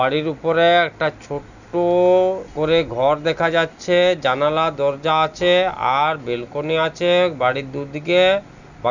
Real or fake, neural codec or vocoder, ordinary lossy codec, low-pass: real; none; none; 7.2 kHz